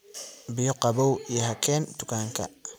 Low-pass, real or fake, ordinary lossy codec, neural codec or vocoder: none; fake; none; vocoder, 44.1 kHz, 128 mel bands every 256 samples, BigVGAN v2